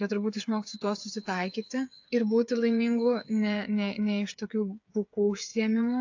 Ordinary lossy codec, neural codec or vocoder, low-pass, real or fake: AAC, 48 kbps; codec, 16 kHz, 8 kbps, FreqCodec, smaller model; 7.2 kHz; fake